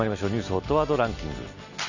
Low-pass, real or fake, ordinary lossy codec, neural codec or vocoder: 7.2 kHz; real; none; none